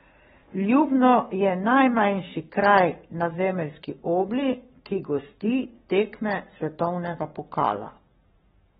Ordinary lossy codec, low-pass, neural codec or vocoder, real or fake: AAC, 16 kbps; 19.8 kHz; codec, 44.1 kHz, 7.8 kbps, Pupu-Codec; fake